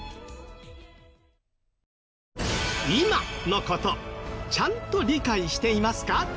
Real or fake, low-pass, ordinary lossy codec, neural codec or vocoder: real; none; none; none